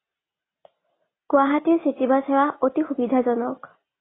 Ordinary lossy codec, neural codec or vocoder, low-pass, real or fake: AAC, 16 kbps; none; 7.2 kHz; real